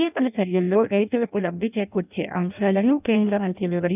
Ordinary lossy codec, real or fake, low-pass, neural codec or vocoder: none; fake; 3.6 kHz; codec, 16 kHz in and 24 kHz out, 0.6 kbps, FireRedTTS-2 codec